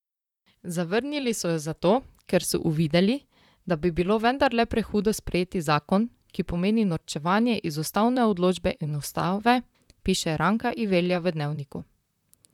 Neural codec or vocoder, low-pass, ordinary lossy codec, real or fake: vocoder, 44.1 kHz, 128 mel bands, Pupu-Vocoder; 19.8 kHz; none; fake